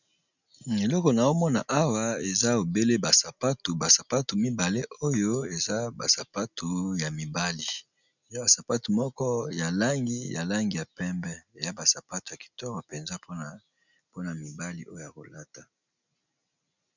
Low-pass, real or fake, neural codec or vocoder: 7.2 kHz; real; none